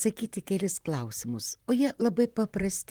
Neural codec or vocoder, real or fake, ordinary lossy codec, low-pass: vocoder, 44.1 kHz, 128 mel bands, Pupu-Vocoder; fake; Opus, 24 kbps; 19.8 kHz